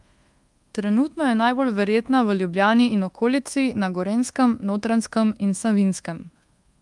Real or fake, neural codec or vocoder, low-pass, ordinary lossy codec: fake; codec, 24 kHz, 1.2 kbps, DualCodec; 10.8 kHz; Opus, 32 kbps